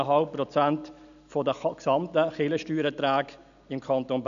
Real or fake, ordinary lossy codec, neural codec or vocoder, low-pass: real; none; none; 7.2 kHz